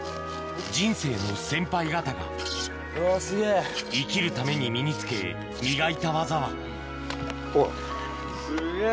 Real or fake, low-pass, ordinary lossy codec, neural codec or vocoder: real; none; none; none